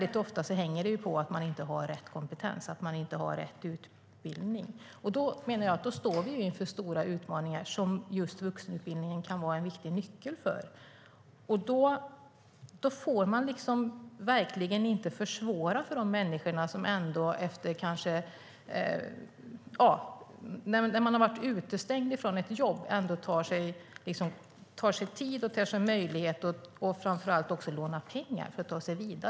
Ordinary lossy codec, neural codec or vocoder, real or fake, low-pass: none; none; real; none